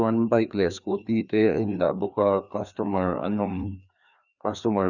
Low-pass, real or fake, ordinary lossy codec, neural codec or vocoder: 7.2 kHz; fake; none; codec, 16 kHz, 2 kbps, FreqCodec, larger model